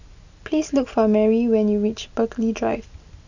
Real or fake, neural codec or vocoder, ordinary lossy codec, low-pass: real; none; none; 7.2 kHz